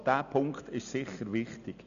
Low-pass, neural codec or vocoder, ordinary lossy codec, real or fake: 7.2 kHz; none; none; real